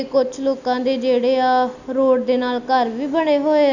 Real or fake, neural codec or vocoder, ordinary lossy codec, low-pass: real; none; none; 7.2 kHz